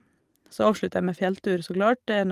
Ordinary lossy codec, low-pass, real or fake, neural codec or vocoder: Opus, 32 kbps; 14.4 kHz; real; none